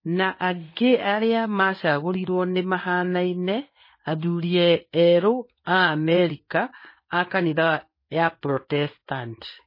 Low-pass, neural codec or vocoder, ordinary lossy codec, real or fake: 5.4 kHz; codec, 16 kHz in and 24 kHz out, 1 kbps, XY-Tokenizer; MP3, 24 kbps; fake